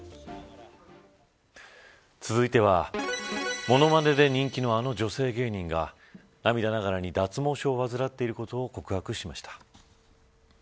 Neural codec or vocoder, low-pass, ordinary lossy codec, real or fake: none; none; none; real